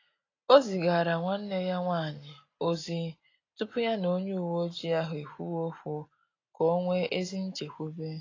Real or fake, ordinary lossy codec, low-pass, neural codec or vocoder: real; AAC, 32 kbps; 7.2 kHz; none